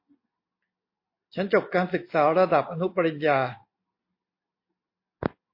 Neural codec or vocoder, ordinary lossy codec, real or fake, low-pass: none; MP3, 32 kbps; real; 5.4 kHz